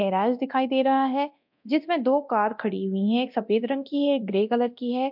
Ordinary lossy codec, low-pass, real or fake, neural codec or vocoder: MP3, 48 kbps; 5.4 kHz; fake; codec, 24 kHz, 0.9 kbps, DualCodec